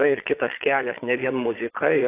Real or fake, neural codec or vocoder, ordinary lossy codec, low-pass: fake; vocoder, 22.05 kHz, 80 mel bands, Vocos; AAC, 16 kbps; 3.6 kHz